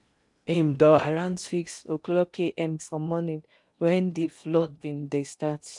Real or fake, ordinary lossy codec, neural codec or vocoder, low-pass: fake; none; codec, 16 kHz in and 24 kHz out, 0.8 kbps, FocalCodec, streaming, 65536 codes; 10.8 kHz